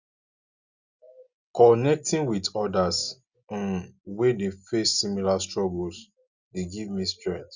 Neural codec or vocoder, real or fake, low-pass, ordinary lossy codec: none; real; 7.2 kHz; Opus, 64 kbps